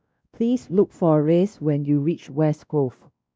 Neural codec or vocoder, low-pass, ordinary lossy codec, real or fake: codec, 16 kHz, 1 kbps, X-Codec, WavLM features, trained on Multilingual LibriSpeech; none; none; fake